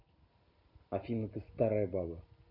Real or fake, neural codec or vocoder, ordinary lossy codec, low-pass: real; none; none; 5.4 kHz